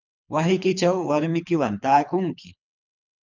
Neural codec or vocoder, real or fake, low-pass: codec, 24 kHz, 3 kbps, HILCodec; fake; 7.2 kHz